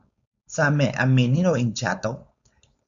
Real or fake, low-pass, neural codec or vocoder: fake; 7.2 kHz; codec, 16 kHz, 4.8 kbps, FACodec